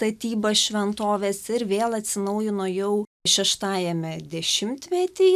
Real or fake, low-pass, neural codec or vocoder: real; 14.4 kHz; none